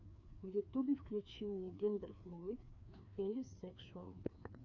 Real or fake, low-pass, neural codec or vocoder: fake; 7.2 kHz; codec, 16 kHz, 2 kbps, FreqCodec, larger model